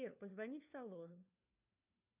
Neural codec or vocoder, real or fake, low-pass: codec, 16 kHz, 4 kbps, FunCodec, trained on LibriTTS, 50 frames a second; fake; 3.6 kHz